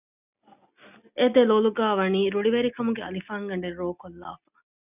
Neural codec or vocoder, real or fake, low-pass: none; real; 3.6 kHz